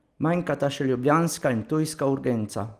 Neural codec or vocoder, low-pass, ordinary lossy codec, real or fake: none; 14.4 kHz; Opus, 24 kbps; real